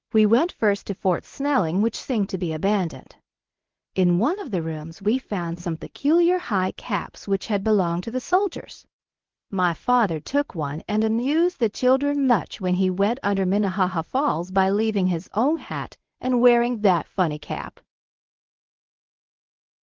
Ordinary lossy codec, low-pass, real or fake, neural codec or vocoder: Opus, 16 kbps; 7.2 kHz; fake; codec, 24 kHz, 0.9 kbps, WavTokenizer, medium speech release version 1